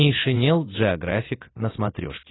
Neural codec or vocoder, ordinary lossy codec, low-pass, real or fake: none; AAC, 16 kbps; 7.2 kHz; real